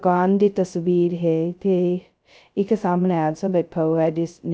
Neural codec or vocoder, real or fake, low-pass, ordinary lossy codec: codec, 16 kHz, 0.2 kbps, FocalCodec; fake; none; none